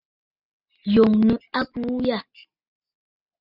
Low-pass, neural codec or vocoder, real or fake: 5.4 kHz; none; real